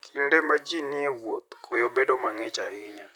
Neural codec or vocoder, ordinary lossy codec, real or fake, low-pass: vocoder, 44.1 kHz, 128 mel bands, Pupu-Vocoder; none; fake; 19.8 kHz